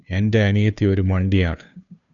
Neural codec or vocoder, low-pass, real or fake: codec, 16 kHz, 2 kbps, FunCodec, trained on Chinese and English, 25 frames a second; 7.2 kHz; fake